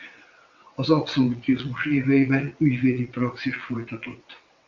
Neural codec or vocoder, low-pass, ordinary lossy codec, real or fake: vocoder, 22.05 kHz, 80 mel bands, WaveNeXt; 7.2 kHz; MP3, 64 kbps; fake